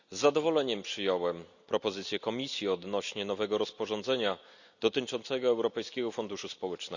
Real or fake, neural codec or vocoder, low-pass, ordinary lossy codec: real; none; 7.2 kHz; none